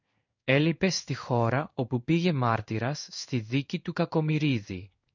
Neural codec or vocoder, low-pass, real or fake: codec, 16 kHz in and 24 kHz out, 1 kbps, XY-Tokenizer; 7.2 kHz; fake